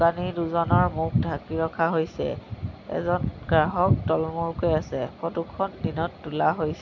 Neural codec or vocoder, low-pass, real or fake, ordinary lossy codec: none; 7.2 kHz; real; none